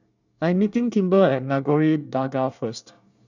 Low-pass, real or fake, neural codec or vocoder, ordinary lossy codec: 7.2 kHz; fake; codec, 24 kHz, 1 kbps, SNAC; none